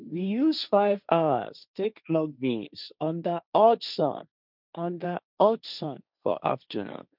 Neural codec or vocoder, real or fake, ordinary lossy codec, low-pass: codec, 16 kHz, 1.1 kbps, Voila-Tokenizer; fake; none; 5.4 kHz